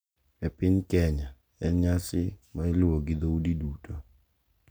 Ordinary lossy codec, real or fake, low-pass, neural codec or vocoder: none; real; none; none